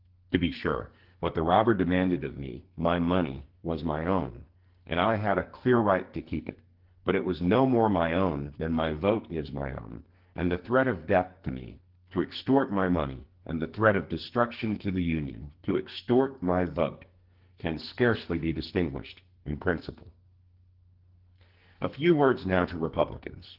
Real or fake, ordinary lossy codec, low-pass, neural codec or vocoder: fake; Opus, 16 kbps; 5.4 kHz; codec, 44.1 kHz, 2.6 kbps, SNAC